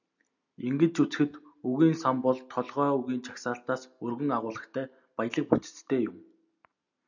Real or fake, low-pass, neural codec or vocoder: real; 7.2 kHz; none